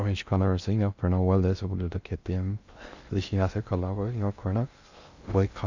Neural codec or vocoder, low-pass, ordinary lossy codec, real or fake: codec, 16 kHz in and 24 kHz out, 0.6 kbps, FocalCodec, streaming, 2048 codes; 7.2 kHz; Opus, 64 kbps; fake